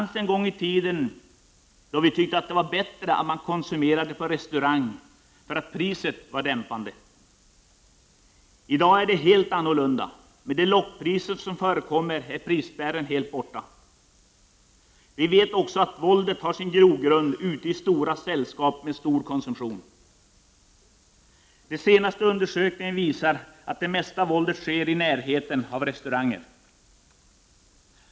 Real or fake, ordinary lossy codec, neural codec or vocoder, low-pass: real; none; none; none